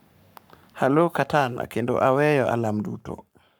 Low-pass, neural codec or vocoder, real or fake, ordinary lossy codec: none; codec, 44.1 kHz, 7.8 kbps, Pupu-Codec; fake; none